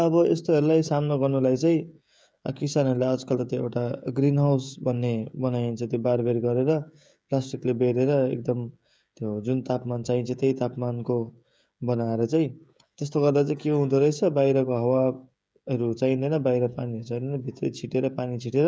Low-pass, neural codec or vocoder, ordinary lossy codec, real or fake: none; codec, 16 kHz, 16 kbps, FreqCodec, smaller model; none; fake